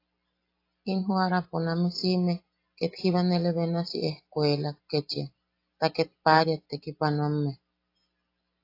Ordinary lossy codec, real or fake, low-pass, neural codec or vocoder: AAC, 32 kbps; real; 5.4 kHz; none